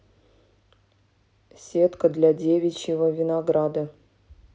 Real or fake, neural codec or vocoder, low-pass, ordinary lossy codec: real; none; none; none